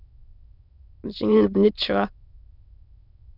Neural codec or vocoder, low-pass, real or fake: autoencoder, 22.05 kHz, a latent of 192 numbers a frame, VITS, trained on many speakers; 5.4 kHz; fake